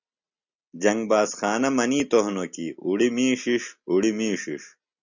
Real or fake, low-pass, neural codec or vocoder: real; 7.2 kHz; none